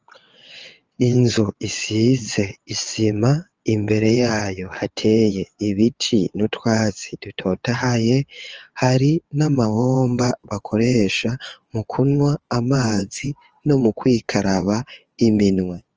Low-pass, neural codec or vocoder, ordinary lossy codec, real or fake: 7.2 kHz; vocoder, 22.05 kHz, 80 mel bands, WaveNeXt; Opus, 24 kbps; fake